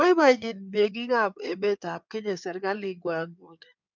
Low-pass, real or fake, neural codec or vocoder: 7.2 kHz; fake; codec, 16 kHz in and 24 kHz out, 2.2 kbps, FireRedTTS-2 codec